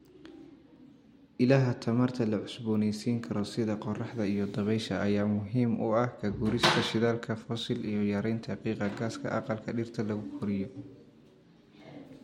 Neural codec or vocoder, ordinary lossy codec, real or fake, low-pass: none; MP3, 64 kbps; real; 19.8 kHz